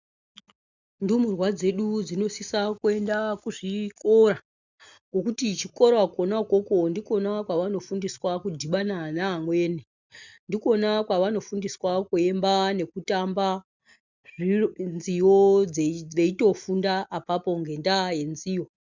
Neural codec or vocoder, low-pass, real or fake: none; 7.2 kHz; real